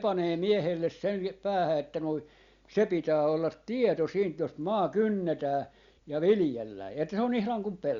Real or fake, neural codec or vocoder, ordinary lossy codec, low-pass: real; none; Opus, 64 kbps; 7.2 kHz